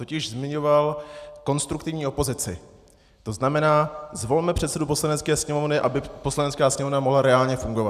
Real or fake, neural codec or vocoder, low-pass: real; none; 14.4 kHz